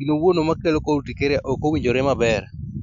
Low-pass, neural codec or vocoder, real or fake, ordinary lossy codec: 7.2 kHz; none; real; none